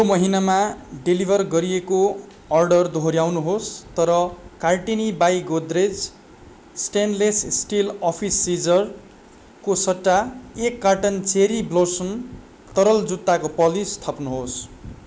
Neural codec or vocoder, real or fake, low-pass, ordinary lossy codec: none; real; none; none